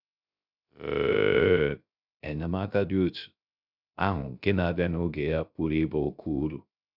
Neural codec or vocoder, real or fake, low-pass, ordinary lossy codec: codec, 16 kHz, 0.3 kbps, FocalCodec; fake; 5.4 kHz; none